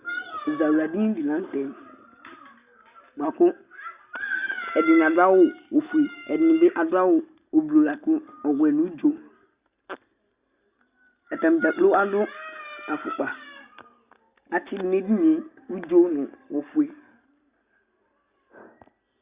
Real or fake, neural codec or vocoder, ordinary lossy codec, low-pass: real; none; Opus, 64 kbps; 3.6 kHz